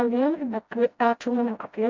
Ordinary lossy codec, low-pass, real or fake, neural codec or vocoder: MP3, 64 kbps; 7.2 kHz; fake; codec, 16 kHz, 0.5 kbps, FreqCodec, smaller model